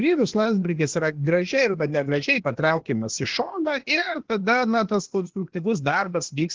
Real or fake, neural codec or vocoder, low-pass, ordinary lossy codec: fake; codec, 16 kHz, 0.8 kbps, ZipCodec; 7.2 kHz; Opus, 16 kbps